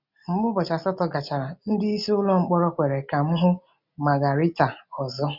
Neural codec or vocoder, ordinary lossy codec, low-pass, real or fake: none; none; 5.4 kHz; real